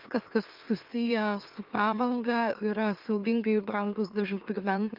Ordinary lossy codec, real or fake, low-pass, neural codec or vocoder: Opus, 32 kbps; fake; 5.4 kHz; autoencoder, 44.1 kHz, a latent of 192 numbers a frame, MeloTTS